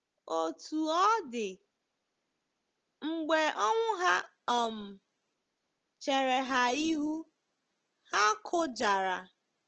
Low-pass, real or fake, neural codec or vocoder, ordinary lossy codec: 7.2 kHz; real; none; Opus, 16 kbps